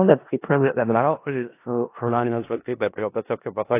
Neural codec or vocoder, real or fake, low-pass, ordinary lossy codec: codec, 16 kHz in and 24 kHz out, 0.4 kbps, LongCat-Audio-Codec, four codebook decoder; fake; 3.6 kHz; AAC, 24 kbps